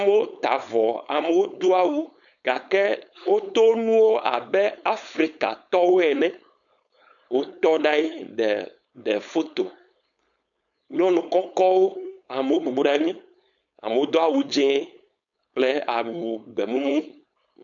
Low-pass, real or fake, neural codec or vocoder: 7.2 kHz; fake; codec, 16 kHz, 4.8 kbps, FACodec